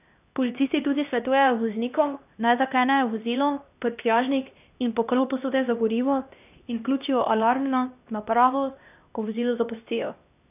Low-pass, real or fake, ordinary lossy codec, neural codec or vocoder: 3.6 kHz; fake; none; codec, 16 kHz, 1 kbps, X-Codec, HuBERT features, trained on LibriSpeech